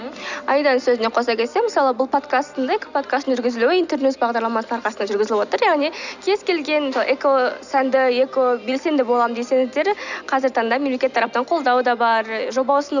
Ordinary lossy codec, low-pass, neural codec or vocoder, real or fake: none; 7.2 kHz; none; real